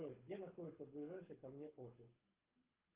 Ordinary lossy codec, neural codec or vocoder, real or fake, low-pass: Opus, 24 kbps; codec, 24 kHz, 6 kbps, HILCodec; fake; 3.6 kHz